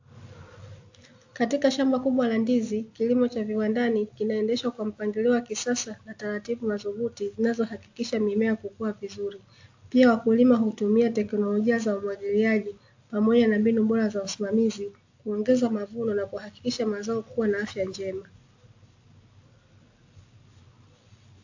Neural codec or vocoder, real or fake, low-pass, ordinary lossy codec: none; real; 7.2 kHz; AAC, 48 kbps